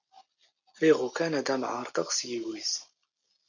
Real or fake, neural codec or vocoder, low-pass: real; none; 7.2 kHz